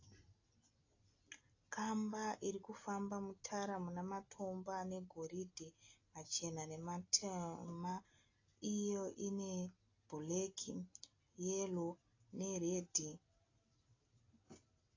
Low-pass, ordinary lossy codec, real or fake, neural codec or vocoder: 7.2 kHz; AAC, 32 kbps; real; none